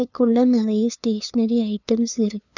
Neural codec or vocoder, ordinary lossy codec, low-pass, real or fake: codec, 16 kHz, 2 kbps, FunCodec, trained on LibriTTS, 25 frames a second; none; 7.2 kHz; fake